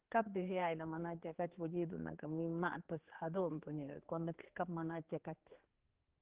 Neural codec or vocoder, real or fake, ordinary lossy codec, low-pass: codec, 16 kHz, 4 kbps, X-Codec, HuBERT features, trained on general audio; fake; Opus, 16 kbps; 3.6 kHz